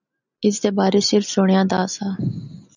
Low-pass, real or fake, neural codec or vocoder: 7.2 kHz; real; none